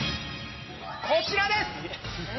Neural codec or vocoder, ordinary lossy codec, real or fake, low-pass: none; MP3, 24 kbps; real; 7.2 kHz